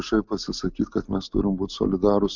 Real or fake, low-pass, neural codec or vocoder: real; 7.2 kHz; none